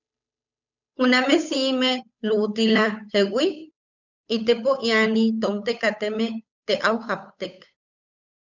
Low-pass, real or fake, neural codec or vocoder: 7.2 kHz; fake; codec, 16 kHz, 8 kbps, FunCodec, trained on Chinese and English, 25 frames a second